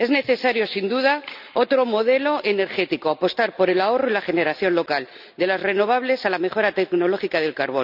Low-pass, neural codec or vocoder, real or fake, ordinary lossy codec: 5.4 kHz; none; real; none